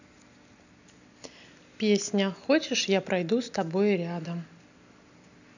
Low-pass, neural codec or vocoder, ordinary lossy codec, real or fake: 7.2 kHz; none; none; real